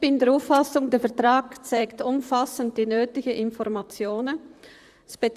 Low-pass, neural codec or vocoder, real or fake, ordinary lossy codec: 14.4 kHz; vocoder, 44.1 kHz, 128 mel bands, Pupu-Vocoder; fake; Opus, 64 kbps